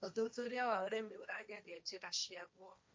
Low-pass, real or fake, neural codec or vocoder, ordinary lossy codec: none; fake; codec, 16 kHz, 1.1 kbps, Voila-Tokenizer; none